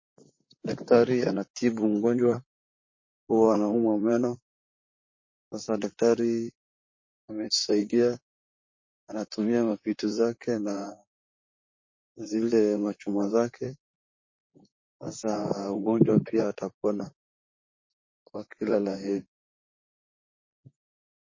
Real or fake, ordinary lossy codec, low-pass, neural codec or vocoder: fake; MP3, 32 kbps; 7.2 kHz; vocoder, 44.1 kHz, 128 mel bands, Pupu-Vocoder